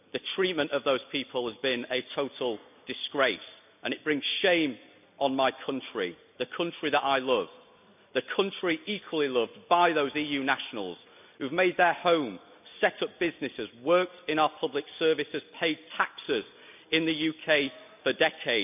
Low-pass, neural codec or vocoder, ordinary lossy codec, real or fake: 3.6 kHz; none; none; real